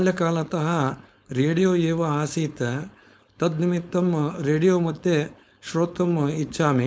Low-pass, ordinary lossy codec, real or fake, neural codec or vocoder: none; none; fake; codec, 16 kHz, 4.8 kbps, FACodec